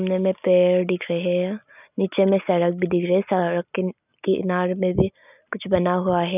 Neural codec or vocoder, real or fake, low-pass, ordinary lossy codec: none; real; 3.6 kHz; none